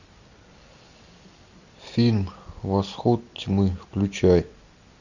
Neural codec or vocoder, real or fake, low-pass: none; real; 7.2 kHz